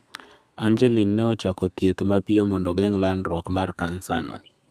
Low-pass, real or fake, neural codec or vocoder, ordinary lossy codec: 14.4 kHz; fake; codec, 32 kHz, 1.9 kbps, SNAC; none